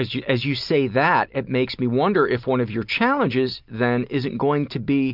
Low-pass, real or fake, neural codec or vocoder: 5.4 kHz; real; none